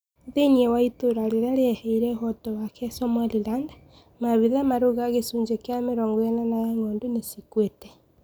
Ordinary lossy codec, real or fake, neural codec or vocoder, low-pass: none; real; none; none